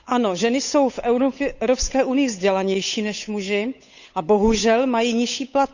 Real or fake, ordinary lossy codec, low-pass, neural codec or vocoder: fake; none; 7.2 kHz; codec, 16 kHz, 8 kbps, FunCodec, trained on Chinese and English, 25 frames a second